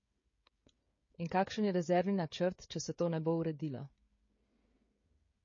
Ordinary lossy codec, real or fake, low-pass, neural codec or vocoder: MP3, 32 kbps; fake; 7.2 kHz; codec, 16 kHz, 16 kbps, FreqCodec, smaller model